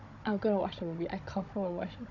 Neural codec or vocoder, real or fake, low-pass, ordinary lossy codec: codec, 16 kHz, 16 kbps, FunCodec, trained on Chinese and English, 50 frames a second; fake; 7.2 kHz; none